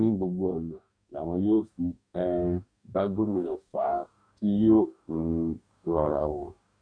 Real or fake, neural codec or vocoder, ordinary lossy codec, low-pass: fake; codec, 44.1 kHz, 2.6 kbps, DAC; none; 9.9 kHz